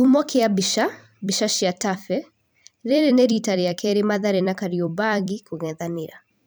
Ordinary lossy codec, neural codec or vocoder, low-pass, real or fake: none; vocoder, 44.1 kHz, 128 mel bands every 256 samples, BigVGAN v2; none; fake